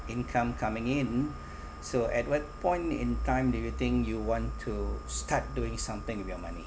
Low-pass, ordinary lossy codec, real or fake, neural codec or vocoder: none; none; real; none